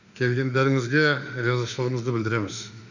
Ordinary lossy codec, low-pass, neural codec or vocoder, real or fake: none; 7.2 kHz; autoencoder, 48 kHz, 32 numbers a frame, DAC-VAE, trained on Japanese speech; fake